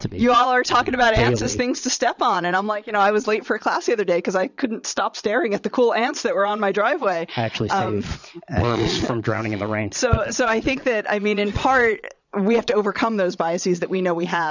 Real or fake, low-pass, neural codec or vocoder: fake; 7.2 kHz; vocoder, 22.05 kHz, 80 mel bands, Vocos